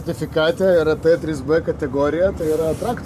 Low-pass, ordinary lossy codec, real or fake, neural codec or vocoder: 14.4 kHz; MP3, 96 kbps; fake; vocoder, 44.1 kHz, 128 mel bands every 512 samples, BigVGAN v2